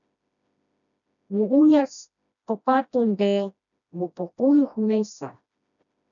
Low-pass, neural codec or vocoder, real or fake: 7.2 kHz; codec, 16 kHz, 1 kbps, FreqCodec, smaller model; fake